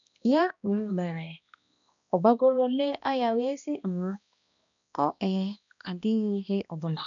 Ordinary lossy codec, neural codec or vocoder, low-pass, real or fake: none; codec, 16 kHz, 1 kbps, X-Codec, HuBERT features, trained on balanced general audio; 7.2 kHz; fake